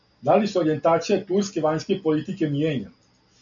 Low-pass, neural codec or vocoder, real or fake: 7.2 kHz; none; real